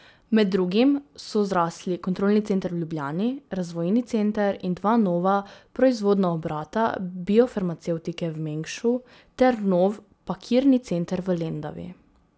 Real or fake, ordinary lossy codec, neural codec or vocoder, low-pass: real; none; none; none